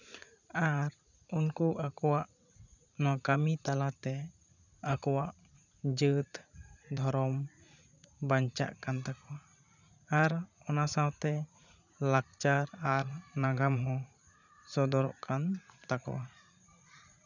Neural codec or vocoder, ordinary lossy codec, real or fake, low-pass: vocoder, 44.1 kHz, 80 mel bands, Vocos; none; fake; 7.2 kHz